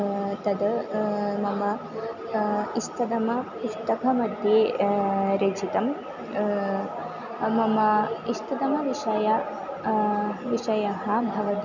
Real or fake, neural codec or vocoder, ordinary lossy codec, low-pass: real; none; none; 7.2 kHz